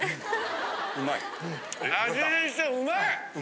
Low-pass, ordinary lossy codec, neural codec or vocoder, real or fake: none; none; none; real